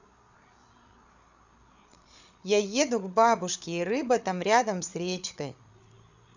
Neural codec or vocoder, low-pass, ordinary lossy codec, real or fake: codec, 16 kHz, 8 kbps, FreqCodec, larger model; 7.2 kHz; none; fake